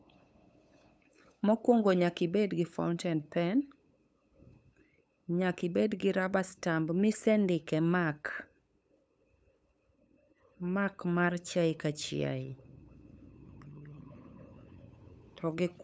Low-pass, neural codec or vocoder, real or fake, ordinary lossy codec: none; codec, 16 kHz, 8 kbps, FunCodec, trained on LibriTTS, 25 frames a second; fake; none